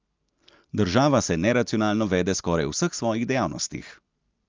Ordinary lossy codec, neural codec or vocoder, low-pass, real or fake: Opus, 24 kbps; none; 7.2 kHz; real